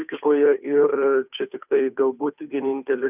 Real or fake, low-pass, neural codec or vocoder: fake; 3.6 kHz; codec, 16 kHz, 2 kbps, FunCodec, trained on Chinese and English, 25 frames a second